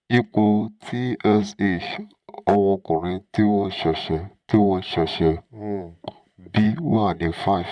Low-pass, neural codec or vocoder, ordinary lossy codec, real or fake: 9.9 kHz; vocoder, 44.1 kHz, 128 mel bands, Pupu-Vocoder; MP3, 96 kbps; fake